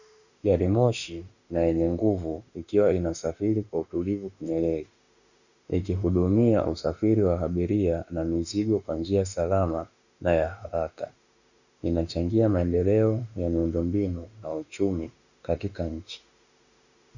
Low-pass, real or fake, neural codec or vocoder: 7.2 kHz; fake; autoencoder, 48 kHz, 32 numbers a frame, DAC-VAE, trained on Japanese speech